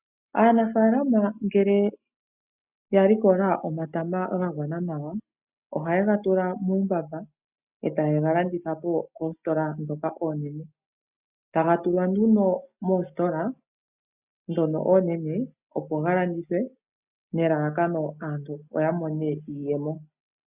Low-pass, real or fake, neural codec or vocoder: 3.6 kHz; real; none